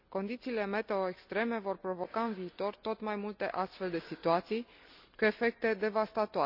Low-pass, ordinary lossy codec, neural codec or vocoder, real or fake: 5.4 kHz; none; none; real